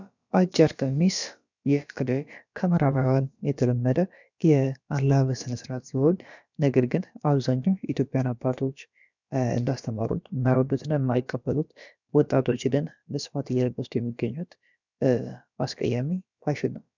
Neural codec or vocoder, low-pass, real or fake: codec, 16 kHz, about 1 kbps, DyCAST, with the encoder's durations; 7.2 kHz; fake